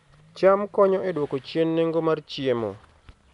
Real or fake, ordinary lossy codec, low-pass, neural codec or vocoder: real; MP3, 96 kbps; 10.8 kHz; none